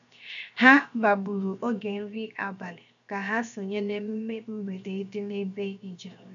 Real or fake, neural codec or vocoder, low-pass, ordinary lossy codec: fake; codec, 16 kHz, 0.7 kbps, FocalCodec; 7.2 kHz; none